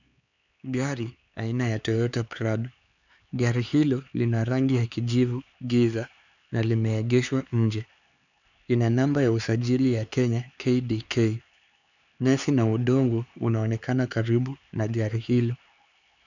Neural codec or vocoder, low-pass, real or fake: codec, 16 kHz, 4 kbps, X-Codec, HuBERT features, trained on LibriSpeech; 7.2 kHz; fake